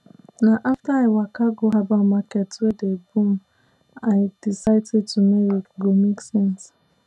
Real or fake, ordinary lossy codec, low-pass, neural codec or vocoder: real; none; none; none